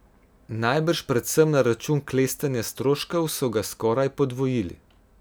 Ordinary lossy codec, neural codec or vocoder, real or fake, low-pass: none; none; real; none